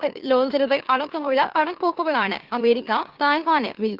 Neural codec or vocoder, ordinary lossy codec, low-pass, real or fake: autoencoder, 44.1 kHz, a latent of 192 numbers a frame, MeloTTS; Opus, 16 kbps; 5.4 kHz; fake